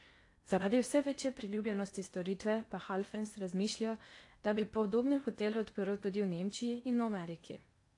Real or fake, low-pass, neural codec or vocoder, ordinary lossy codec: fake; 10.8 kHz; codec, 16 kHz in and 24 kHz out, 0.8 kbps, FocalCodec, streaming, 65536 codes; AAC, 48 kbps